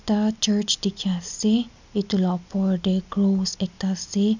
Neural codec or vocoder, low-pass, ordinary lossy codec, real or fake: none; 7.2 kHz; none; real